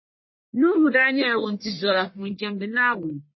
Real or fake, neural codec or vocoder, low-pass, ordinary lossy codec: fake; codec, 44.1 kHz, 1.7 kbps, Pupu-Codec; 7.2 kHz; MP3, 24 kbps